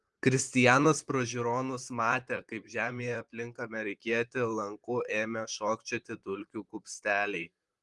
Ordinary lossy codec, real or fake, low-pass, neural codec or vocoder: Opus, 24 kbps; fake; 10.8 kHz; vocoder, 44.1 kHz, 128 mel bands, Pupu-Vocoder